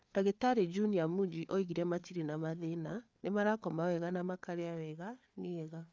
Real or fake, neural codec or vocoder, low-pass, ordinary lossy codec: fake; codec, 16 kHz, 4 kbps, FreqCodec, larger model; none; none